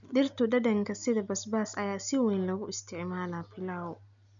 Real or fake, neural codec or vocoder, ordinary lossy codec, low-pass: real; none; none; 7.2 kHz